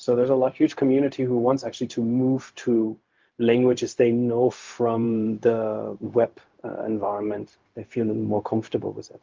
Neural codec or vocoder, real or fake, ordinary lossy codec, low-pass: codec, 16 kHz, 0.4 kbps, LongCat-Audio-Codec; fake; Opus, 32 kbps; 7.2 kHz